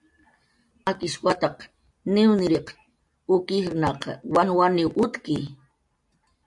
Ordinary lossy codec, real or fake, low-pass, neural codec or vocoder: MP3, 64 kbps; real; 10.8 kHz; none